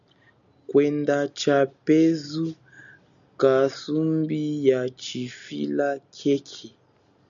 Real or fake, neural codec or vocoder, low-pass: real; none; 7.2 kHz